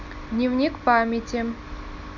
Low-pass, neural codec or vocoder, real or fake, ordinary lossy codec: 7.2 kHz; none; real; none